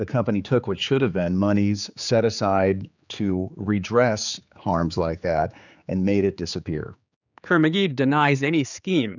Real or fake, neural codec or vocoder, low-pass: fake; codec, 16 kHz, 4 kbps, X-Codec, HuBERT features, trained on general audio; 7.2 kHz